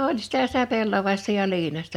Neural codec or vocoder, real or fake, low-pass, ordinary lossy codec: none; real; 19.8 kHz; none